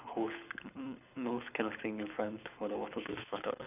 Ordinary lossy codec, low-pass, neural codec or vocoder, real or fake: none; 3.6 kHz; codec, 24 kHz, 6 kbps, HILCodec; fake